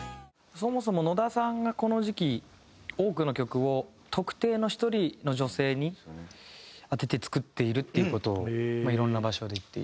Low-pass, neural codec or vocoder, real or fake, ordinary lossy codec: none; none; real; none